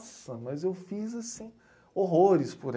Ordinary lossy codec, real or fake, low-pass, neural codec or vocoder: none; real; none; none